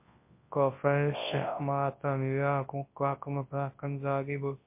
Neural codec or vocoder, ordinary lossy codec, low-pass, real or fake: codec, 24 kHz, 0.9 kbps, WavTokenizer, large speech release; MP3, 24 kbps; 3.6 kHz; fake